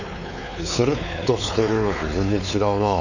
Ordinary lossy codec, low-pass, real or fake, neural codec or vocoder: none; 7.2 kHz; fake; codec, 16 kHz, 4 kbps, X-Codec, WavLM features, trained on Multilingual LibriSpeech